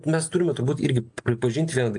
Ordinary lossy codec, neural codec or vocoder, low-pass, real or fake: AAC, 64 kbps; none; 9.9 kHz; real